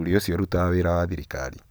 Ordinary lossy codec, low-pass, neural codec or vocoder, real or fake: none; none; none; real